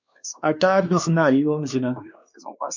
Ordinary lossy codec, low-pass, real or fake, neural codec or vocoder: MP3, 64 kbps; 7.2 kHz; fake; codec, 16 kHz, 2 kbps, X-Codec, WavLM features, trained on Multilingual LibriSpeech